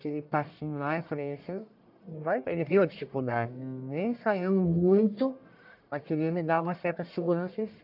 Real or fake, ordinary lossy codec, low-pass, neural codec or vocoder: fake; AAC, 48 kbps; 5.4 kHz; codec, 44.1 kHz, 1.7 kbps, Pupu-Codec